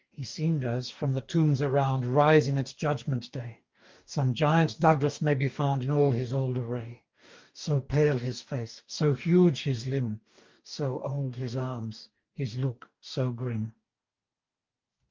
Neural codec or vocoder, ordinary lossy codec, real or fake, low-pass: codec, 44.1 kHz, 2.6 kbps, DAC; Opus, 32 kbps; fake; 7.2 kHz